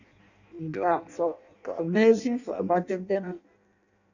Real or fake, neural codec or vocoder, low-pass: fake; codec, 16 kHz in and 24 kHz out, 0.6 kbps, FireRedTTS-2 codec; 7.2 kHz